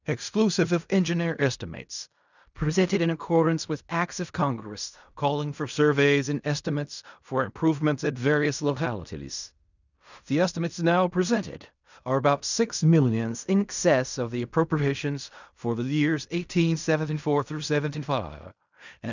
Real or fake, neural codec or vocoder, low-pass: fake; codec, 16 kHz in and 24 kHz out, 0.4 kbps, LongCat-Audio-Codec, fine tuned four codebook decoder; 7.2 kHz